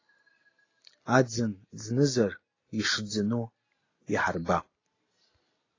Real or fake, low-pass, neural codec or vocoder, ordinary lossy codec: real; 7.2 kHz; none; AAC, 32 kbps